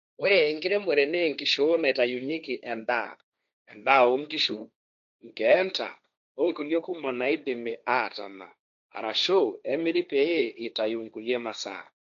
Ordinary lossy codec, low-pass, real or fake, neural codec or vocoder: none; 7.2 kHz; fake; codec, 16 kHz, 1.1 kbps, Voila-Tokenizer